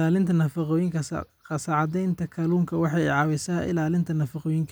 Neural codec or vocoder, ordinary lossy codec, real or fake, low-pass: none; none; real; none